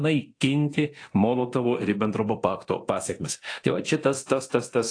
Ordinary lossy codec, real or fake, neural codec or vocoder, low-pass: AAC, 48 kbps; fake; codec, 24 kHz, 0.9 kbps, DualCodec; 9.9 kHz